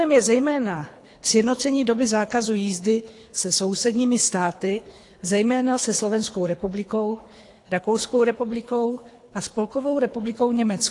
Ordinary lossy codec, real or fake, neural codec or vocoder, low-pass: AAC, 48 kbps; fake; codec, 24 kHz, 3 kbps, HILCodec; 10.8 kHz